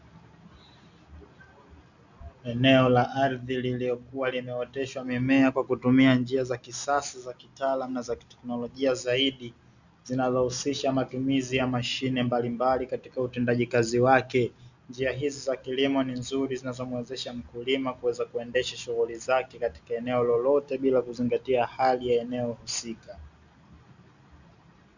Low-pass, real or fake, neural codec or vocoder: 7.2 kHz; real; none